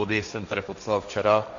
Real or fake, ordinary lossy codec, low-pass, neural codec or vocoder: fake; AAC, 64 kbps; 7.2 kHz; codec, 16 kHz, 1.1 kbps, Voila-Tokenizer